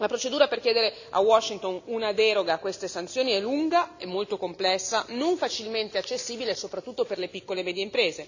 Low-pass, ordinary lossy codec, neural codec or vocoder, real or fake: 7.2 kHz; none; none; real